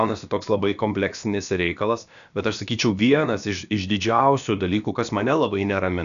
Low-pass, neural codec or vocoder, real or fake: 7.2 kHz; codec, 16 kHz, about 1 kbps, DyCAST, with the encoder's durations; fake